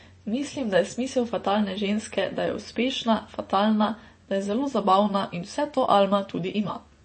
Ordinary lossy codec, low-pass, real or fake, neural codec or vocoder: MP3, 32 kbps; 9.9 kHz; fake; vocoder, 24 kHz, 100 mel bands, Vocos